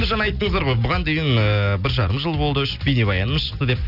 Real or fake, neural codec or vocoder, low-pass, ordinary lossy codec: real; none; 5.4 kHz; none